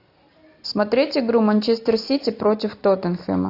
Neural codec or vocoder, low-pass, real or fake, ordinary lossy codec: none; 5.4 kHz; real; AAC, 32 kbps